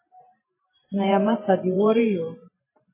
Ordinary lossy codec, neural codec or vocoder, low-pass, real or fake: MP3, 16 kbps; vocoder, 44.1 kHz, 128 mel bands every 512 samples, BigVGAN v2; 3.6 kHz; fake